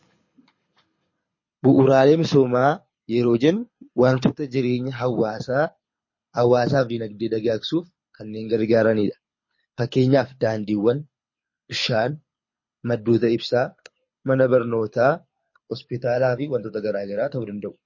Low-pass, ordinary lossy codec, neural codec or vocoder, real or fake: 7.2 kHz; MP3, 32 kbps; codec, 24 kHz, 6 kbps, HILCodec; fake